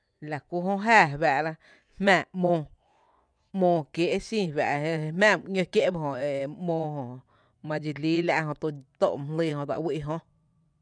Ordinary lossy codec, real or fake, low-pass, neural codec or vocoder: none; fake; 9.9 kHz; vocoder, 24 kHz, 100 mel bands, Vocos